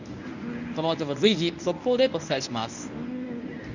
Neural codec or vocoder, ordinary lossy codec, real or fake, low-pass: codec, 24 kHz, 0.9 kbps, WavTokenizer, medium speech release version 1; none; fake; 7.2 kHz